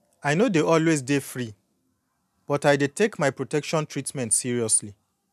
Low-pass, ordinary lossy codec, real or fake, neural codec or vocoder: 14.4 kHz; none; real; none